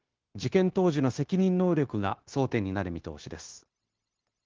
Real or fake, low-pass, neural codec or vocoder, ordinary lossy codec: fake; 7.2 kHz; codec, 16 kHz, 0.9 kbps, LongCat-Audio-Codec; Opus, 16 kbps